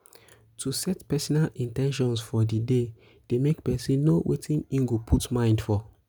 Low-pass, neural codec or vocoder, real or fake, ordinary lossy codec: none; none; real; none